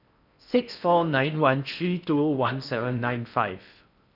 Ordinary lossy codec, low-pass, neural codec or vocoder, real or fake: none; 5.4 kHz; codec, 16 kHz in and 24 kHz out, 0.6 kbps, FocalCodec, streaming, 4096 codes; fake